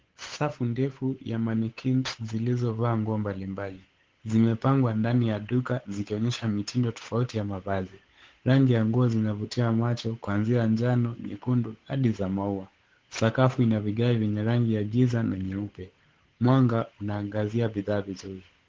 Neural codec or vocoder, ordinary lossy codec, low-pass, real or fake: codec, 16 kHz, 8 kbps, FunCodec, trained on Chinese and English, 25 frames a second; Opus, 16 kbps; 7.2 kHz; fake